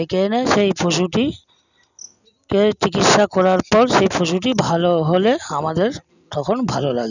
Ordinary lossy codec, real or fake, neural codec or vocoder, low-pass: none; real; none; 7.2 kHz